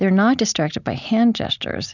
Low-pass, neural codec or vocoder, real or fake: 7.2 kHz; none; real